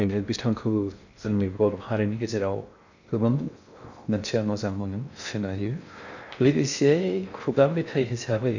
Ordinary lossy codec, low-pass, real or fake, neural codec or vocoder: none; 7.2 kHz; fake; codec, 16 kHz in and 24 kHz out, 0.6 kbps, FocalCodec, streaming, 2048 codes